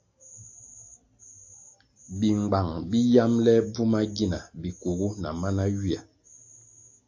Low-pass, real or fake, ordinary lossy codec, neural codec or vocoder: 7.2 kHz; real; AAC, 48 kbps; none